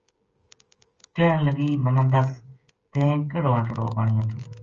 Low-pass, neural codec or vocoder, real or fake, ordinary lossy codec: 7.2 kHz; codec, 16 kHz, 8 kbps, FreqCodec, smaller model; fake; Opus, 24 kbps